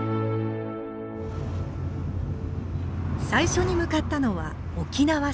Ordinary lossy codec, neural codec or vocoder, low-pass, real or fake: none; none; none; real